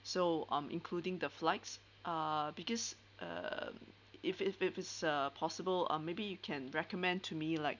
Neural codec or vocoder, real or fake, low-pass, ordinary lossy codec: none; real; 7.2 kHz; none